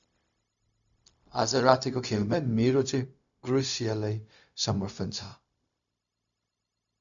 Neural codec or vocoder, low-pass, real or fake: codec, 16 kHz, 0.4 kbps, LongCat-Audio-Codec; 7.2 kHz; fake